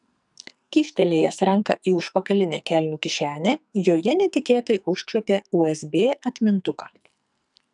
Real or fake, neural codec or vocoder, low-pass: fake; codec, 44.1 kHz, 2.6 kbps, SNAC; 10.8 kHz